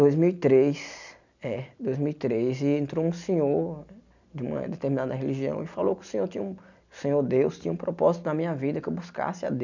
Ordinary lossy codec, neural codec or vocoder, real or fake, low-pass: none; none; real; 7.2 kHz